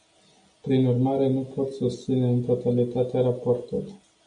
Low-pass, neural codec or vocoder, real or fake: 9.9 kHz; none; real